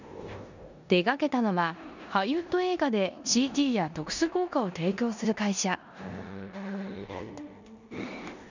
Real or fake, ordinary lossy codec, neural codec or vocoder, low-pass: fake; none; codec, 16 kHz in and 24 kHz out, 0.9 kbps, LongCat-Audio-Codec, four codebook decoder; 7.2 kHz